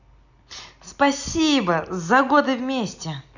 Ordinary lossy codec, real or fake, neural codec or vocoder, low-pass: none; real; none; 7.2 kHz